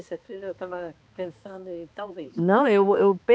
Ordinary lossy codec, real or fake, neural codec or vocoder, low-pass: none; fake; codec, 16 kHz, 2 kbps, X-Codec, HuBERT features, trained on balanced general audio; none